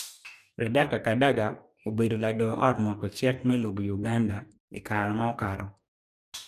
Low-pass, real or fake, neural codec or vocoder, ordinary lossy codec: 14.4 kHz; fake; codec, 44.1 kHz, 2.6 kbps, DAC; none